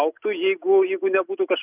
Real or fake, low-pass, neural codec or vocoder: real; 3.6 kHz; none